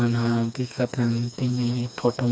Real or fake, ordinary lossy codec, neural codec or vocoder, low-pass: fake; none; codec, 16 kHz, 2 kbps, FreqCodec, smaller model; none